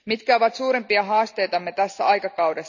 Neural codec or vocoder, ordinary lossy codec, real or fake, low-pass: none; none; real; 7.2 kHz